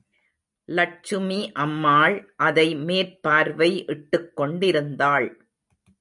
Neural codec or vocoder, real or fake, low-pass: none; real; 10.8 kHz